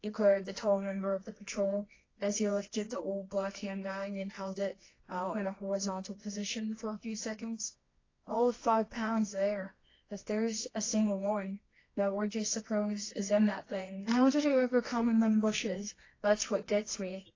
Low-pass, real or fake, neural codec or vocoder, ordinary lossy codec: 7.2 kHz; fake; codec, 24 kHz, 0.9 kbps, WavTokenizer, medium music audio release; AAC, 32 kbps